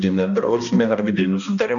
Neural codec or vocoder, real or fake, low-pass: codec, 16 kHz, 1 kbps, X-Codec, HuBERT features, trained on balanced general audio; fake; 7.2 kHz